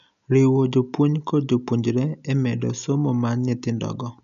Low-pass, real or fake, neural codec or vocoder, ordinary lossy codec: 7.2 kHz; real; none; none